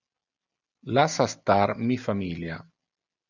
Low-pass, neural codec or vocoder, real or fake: 7.2 kHz; none; real